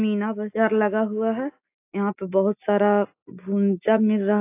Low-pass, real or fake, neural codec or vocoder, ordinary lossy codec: 3.6 kHz; real; none; AAC, 24 kbps